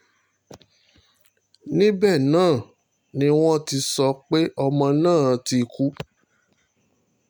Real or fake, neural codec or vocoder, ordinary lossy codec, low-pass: real; none; none; none